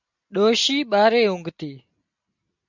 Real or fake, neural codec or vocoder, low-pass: real; none; 7.2 kHz